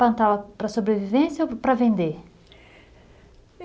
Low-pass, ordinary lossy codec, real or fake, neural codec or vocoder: none; none; real; none